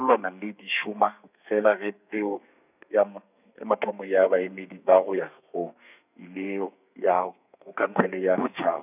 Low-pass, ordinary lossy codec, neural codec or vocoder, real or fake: 3.6 kHz; none; codec, 32 kHz, 1.9 kbps, SNAC; fake